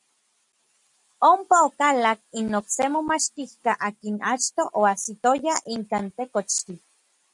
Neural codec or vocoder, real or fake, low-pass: none; real; 10.8 kHz